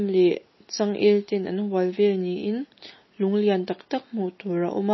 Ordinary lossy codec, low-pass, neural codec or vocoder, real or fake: MP3, 24 kbps; 7.2 kHz; none; real